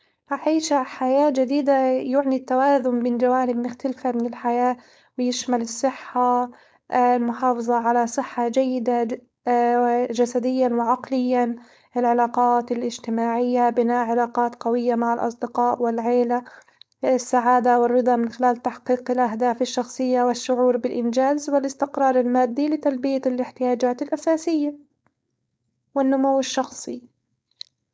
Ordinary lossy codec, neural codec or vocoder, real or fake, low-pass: none; codec, 16 kHz, 4.8 kbps, FACodec; fake; none